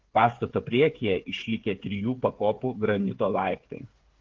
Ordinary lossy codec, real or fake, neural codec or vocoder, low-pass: Opus, 16 kbps; fake; codec, 16 kHz, 8 kbps, FreqCodec, smaller model; 7.2 kHz